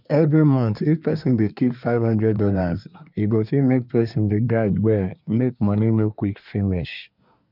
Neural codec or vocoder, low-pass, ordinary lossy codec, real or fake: codec, 24 kHz, 1 kbps, SNAC; 5.4 kHz; none; fake